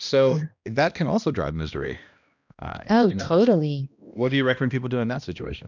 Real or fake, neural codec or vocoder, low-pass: fake; codec, 16 kHz, 1 kbps, X-Codec, HuBERT features, trained on balanced general audio; 7.2 kHz